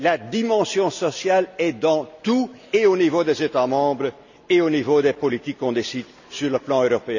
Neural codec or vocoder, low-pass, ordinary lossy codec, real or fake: none; 7.2 kHz; none; real